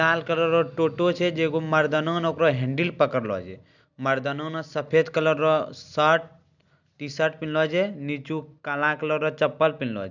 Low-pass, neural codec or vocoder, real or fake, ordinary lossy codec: 7.2 kHz; none; real; none